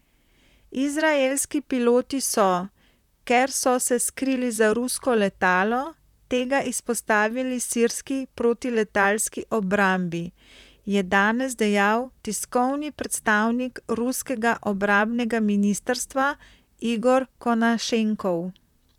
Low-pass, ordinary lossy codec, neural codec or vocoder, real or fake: 19.8 kHz; none; vocoder, 44.1 kHz, 128 mel bands, Pupu-Vocoder; fake